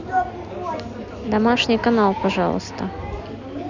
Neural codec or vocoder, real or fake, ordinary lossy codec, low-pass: none; real; none; 7.2 kHz